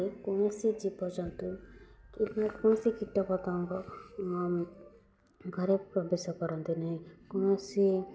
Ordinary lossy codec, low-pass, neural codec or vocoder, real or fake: none; none; none; real